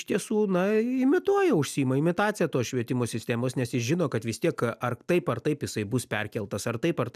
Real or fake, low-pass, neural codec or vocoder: real; 14.4 kHz; none